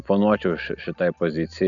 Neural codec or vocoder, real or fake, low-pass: none; real; 7.2 kHz